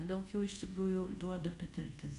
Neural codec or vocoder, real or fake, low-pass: codec, 24 kHz, 0.5 kbps, DualCodec; fake; 10.8 kHz